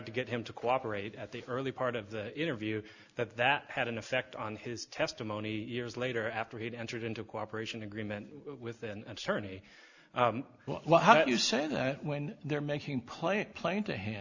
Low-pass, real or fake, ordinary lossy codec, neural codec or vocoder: 7.2 kHz; real; Opus, 64 kbps; none